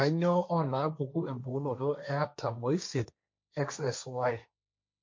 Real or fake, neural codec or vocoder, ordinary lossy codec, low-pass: fake; codec, 16 kHz, 1.1 kbps, Voila-Tokenizer; MP3, 48 kbps; 7.2 kHz